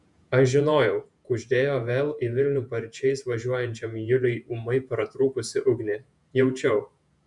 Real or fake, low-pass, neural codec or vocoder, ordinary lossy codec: fake; 10.8 kHz; vocoder, 48 kHz, 128 mel bands, Vocos; MP3, 96 kbps